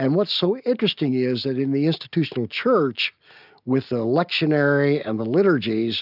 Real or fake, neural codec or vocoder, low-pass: real; none; 5.4 kHz